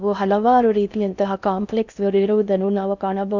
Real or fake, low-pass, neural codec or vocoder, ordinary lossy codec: fake; 7.2 kHz; codec, 16 kHz in and 24 kHz out, 0.6 kbps, FocalCodec, streaming, 4096 codes; none